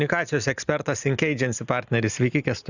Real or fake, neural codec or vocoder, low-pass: real; none; 7.2 kHz